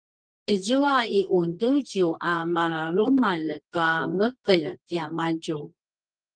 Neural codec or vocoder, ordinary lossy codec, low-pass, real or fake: codec, 24 kHz, 0.9 kbps, WavTokenizer, medium music audio release; Opus, 32 kbps; 9.9 kHz; fake